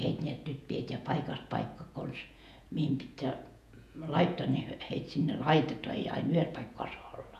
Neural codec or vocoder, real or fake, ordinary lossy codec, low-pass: vocoder, 48 kHz, 128 mel bands, Vocos; fake; none; 14.4 kHz